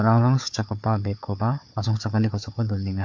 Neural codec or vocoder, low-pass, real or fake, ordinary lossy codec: codec, 16 kHz, 4 kbps, FunCodec, trained on Chinese and English, 50 frames a second; 7.2 kHz; fake; MP3, 48 kbps